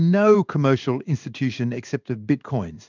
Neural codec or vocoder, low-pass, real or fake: codec, 16 kHz in and 24 kHz out, 1 kbps, XY-Tokenizer; 7.2 kHz; fake